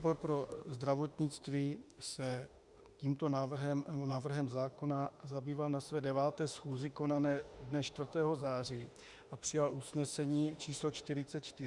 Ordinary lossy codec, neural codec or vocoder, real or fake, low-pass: Opus, 64 kbps; autoencoder, 48 kHz, 32 numbers a frame, DAC-VAE, trained on Japanese speech; fake; 10.8 kHz